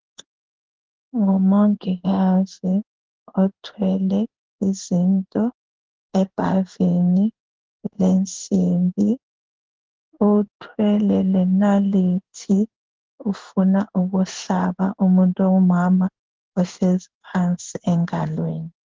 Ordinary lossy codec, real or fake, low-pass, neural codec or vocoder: Opus, 16 kbps; fake; 7.2 kHz; codec, 16 kHz in and 24 kHz out, 1 kbps, XY-Tokenizer